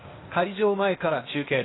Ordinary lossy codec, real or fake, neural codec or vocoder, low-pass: AAC, 16 kbps; fake; codec, 16 kHz, 0.8 kbps, ZipCodec; 7.2 kHz